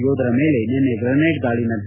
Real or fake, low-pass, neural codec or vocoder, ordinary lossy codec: real; 3.6 kHz; none; none